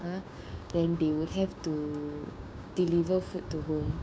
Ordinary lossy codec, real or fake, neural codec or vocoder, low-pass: none; fake; codec, 16 kHz, 6 kbps, DAC; none